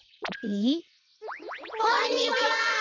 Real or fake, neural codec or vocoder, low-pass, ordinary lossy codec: fake; vocoder, 22.05 kHz, 80 mel bands, WaveNeXt; 7.2 kHz; none